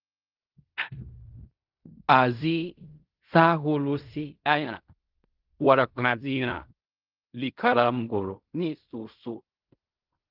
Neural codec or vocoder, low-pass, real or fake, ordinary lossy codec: codec, 16 kHz in and 24 kHz out, 0.4 kbps, LongCat-Audio-Codec, fine tuned four codebook decoder; 5.4 kHz; fake; Opus, 32 kbps